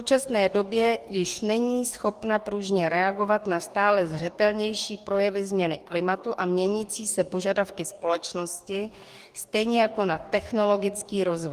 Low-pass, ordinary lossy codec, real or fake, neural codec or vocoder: 14.4 kHz; Opus, 32 kbps; fake; codec, 44.1 kHz, 2.6 kbps, DAC